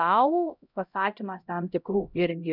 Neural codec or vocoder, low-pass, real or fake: codec, 16 kHz, 0.5 kbps, X-Codec, HuBERT features, trained on LibriSpeech; 5.4 kHz; fake